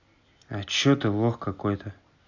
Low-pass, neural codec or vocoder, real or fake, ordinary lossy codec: 7.2 kHz; none; real; AAC, 48 kbps